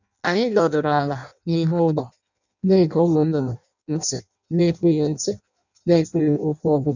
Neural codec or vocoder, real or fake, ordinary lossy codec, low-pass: codec, 16 kHz in and 24 kHz out, 0.6 kbps, FireRedTTS-2 codec; fake; none; 7.2 kHz